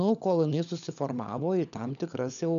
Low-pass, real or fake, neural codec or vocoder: 7.2 kHz; fake; codec, 16 kHz, 16 kbps, FunCodec, trained on LibriTTS, 50 frames a second